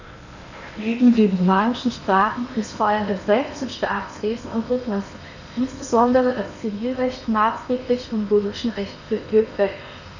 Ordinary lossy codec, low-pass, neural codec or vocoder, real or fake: none; 7.2 kHz; codec, 16 kHz in and 24 kHz out, 0.8 kbps, FocalCodec, streaming, 65536 codes; fake